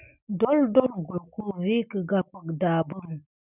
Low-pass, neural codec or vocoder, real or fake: 3.6 kHz; none; real